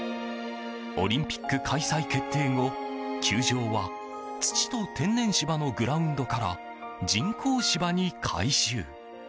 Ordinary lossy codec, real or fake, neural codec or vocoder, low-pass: none; real; none; none